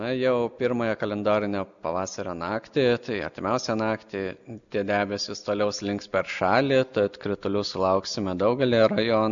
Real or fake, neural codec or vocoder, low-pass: real; none; 7.2 kHz